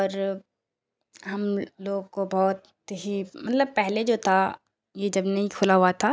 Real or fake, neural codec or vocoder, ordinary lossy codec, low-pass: real; none; none; none